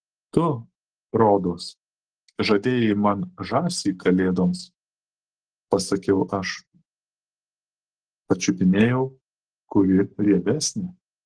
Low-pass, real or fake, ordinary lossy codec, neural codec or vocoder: 9.9 kHz; fake; Opus, 16 kbps; codec, 44.1 kHz, 7.8 kbps, Pupu-Codec